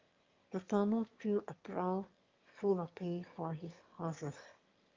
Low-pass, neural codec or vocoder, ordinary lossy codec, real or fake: 7.2 kHz; autoencoder, 22.05 kHz, a latent of 192 numbers a frame, VITS, trained on one speaker; Opus, 32 kbps; fake